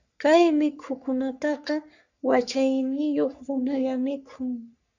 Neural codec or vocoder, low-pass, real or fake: codec, 44.1 kHz, 3.4 kbps, Pupu-Codec; 7.2 kHz; fake